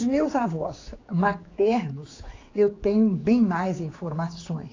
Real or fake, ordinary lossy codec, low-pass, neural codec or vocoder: fake; AAC, 32 kbps; 7.2 kHz; codec, 16 kHz, 4 kbps, X-Codec, HuBERT features, trained on general audio